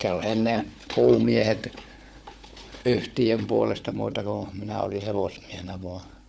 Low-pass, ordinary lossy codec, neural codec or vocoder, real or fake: none; none; codec, 16 kHz, 4 kbps, FunCodec, trained on Chinese and English, 50 frames a second; fake